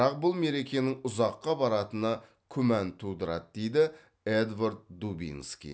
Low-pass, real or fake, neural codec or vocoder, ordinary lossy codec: none; real; none; none